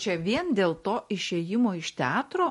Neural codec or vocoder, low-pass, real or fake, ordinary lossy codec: none; 14.4 kHz; real; MP3, 48 kbps